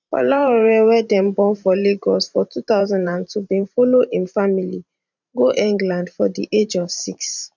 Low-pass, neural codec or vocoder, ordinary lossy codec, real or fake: 7.2 kHz; vocoder, 44.1 kHz, 128 mel bands every 512 samples, BigVGAN v2; none; fake